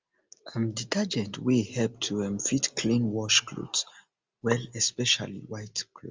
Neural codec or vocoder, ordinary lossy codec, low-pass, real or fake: none; Opus, 32 kbps; 7.2 kHz; real